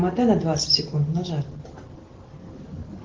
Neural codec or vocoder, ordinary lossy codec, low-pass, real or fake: none; Opus, 16 kbps; 7.2 kHz; real